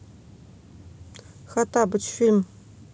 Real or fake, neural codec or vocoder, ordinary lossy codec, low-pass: real; none; none; none